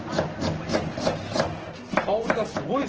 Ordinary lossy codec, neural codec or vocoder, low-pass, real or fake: Opus, 16 kbps; none; 7.2 kHz; real